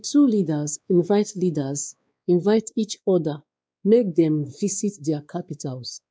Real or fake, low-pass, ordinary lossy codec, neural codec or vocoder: fake; none; none; codec, 16 kHz, 2 kbps, X-Codec, WavLM features, trained on Multilingual LibriSpeech